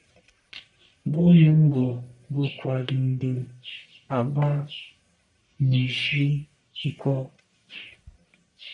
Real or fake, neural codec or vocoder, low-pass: fake; codec, 44.1 kHz, 1.7 kbps, Pupu-Codec; 10.8 kHz